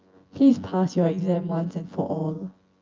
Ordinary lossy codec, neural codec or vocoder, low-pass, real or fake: Opus, 24 kbps; vocoder, 24 kHz, 100 mel bands, Vocos; 7.2 kHz; fake